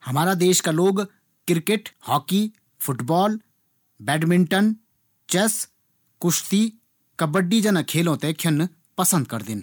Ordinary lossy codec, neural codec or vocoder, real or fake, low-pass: none; none; real; none